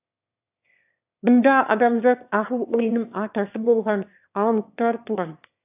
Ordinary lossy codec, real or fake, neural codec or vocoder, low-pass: AAC, 32 kbps; fake; autoencoder, 22.05 kHz, a latent of 192 numbers a frame, VITS, trained on one speaker; 3.6 kHz